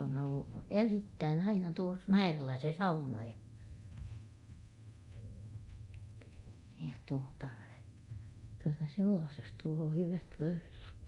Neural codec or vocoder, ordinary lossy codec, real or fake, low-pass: codec, 24 kHz, 0.9 kbps, DualCodec; Opus, 64 kbps; fake; 10.8 kHz